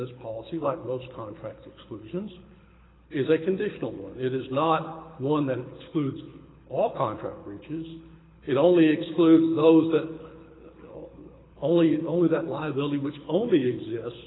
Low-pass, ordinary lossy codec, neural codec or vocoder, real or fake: 7.2 kHz; AAC, 16 kbps; codec, 24 kHz, 6 kbps, HILCodec; fake